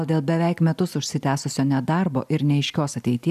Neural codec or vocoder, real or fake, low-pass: none; real; 14.4 kHz